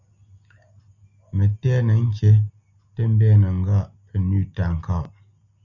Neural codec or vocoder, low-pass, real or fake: none; 7.2 kHz; real